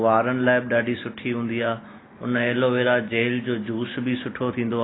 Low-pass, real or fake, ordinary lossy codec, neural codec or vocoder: 7.2 kHz; real; AAC, 16 kbps; none